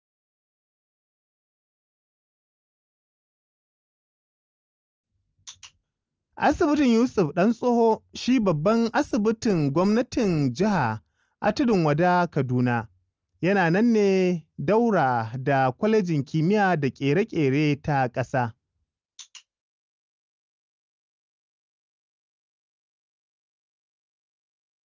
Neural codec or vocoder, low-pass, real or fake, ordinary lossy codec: none; 7.2 kHz; real; Opus, 24 kbps